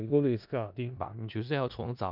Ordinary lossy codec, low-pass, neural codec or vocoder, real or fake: none; 5.4 kHz; codec, 16 kHz in and 24 kHz out, 0.4 kbps, LongCat-Audio-Codec, four codebook decoder; fake